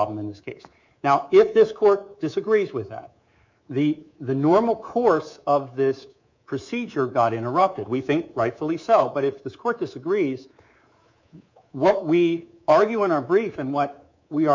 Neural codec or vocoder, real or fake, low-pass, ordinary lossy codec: codec, 24 kHz, 3.1 kbps, DualCodec; fake; 7.2 kHz; MP3, 64 kbps